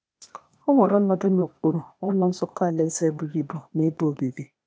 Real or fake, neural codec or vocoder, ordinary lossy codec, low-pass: fake; codec, 16 kHz, 0.8 kbps, ZipCodec; none; none